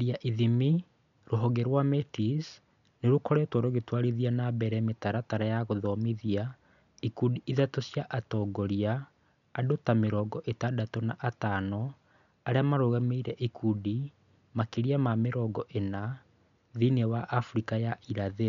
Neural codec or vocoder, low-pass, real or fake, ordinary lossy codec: none; 7.2 kHz; real; none